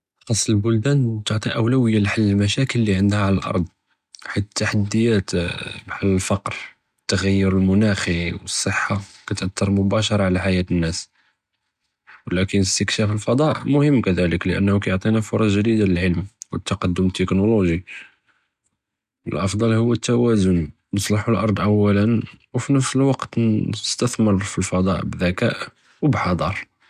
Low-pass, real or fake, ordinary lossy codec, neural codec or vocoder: 14.4 kHz; real; none; none